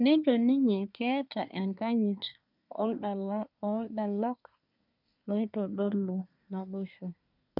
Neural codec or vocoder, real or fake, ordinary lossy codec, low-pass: codec, 24 kHz, 1 kbps, SNAC; fake; none; 5.4 kHz